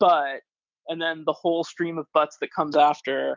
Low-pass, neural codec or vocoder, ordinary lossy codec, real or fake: 7.2 kHz; none; MP3, 64 kbps; real